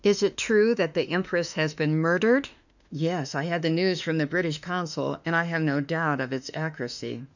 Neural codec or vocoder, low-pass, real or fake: autoencoder, 48 kHz, 32 numbers a frame, DAC-VAE, trained on Japanese speech; 7.2 kHz; fake